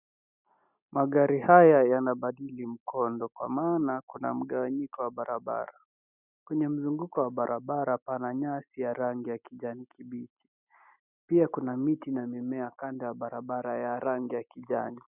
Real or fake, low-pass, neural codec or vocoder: real; 3.6 kHz; none